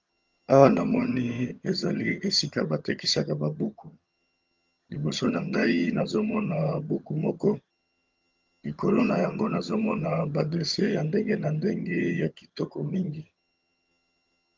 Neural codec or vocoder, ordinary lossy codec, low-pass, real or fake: vocoder, 22.05 kHz, 80 mel bands, HiFi-GAN; Opus, 32 kbps; 7.2 kHz; fake